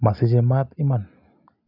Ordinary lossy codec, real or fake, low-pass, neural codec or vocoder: none; real; 5.4 kHz; none